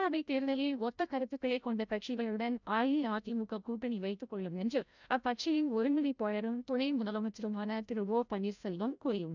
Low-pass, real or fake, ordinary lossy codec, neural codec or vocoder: 7.2 kHz; fake; none; codec, 16 kHz, 0.5 kbps, FreqCodec, larger model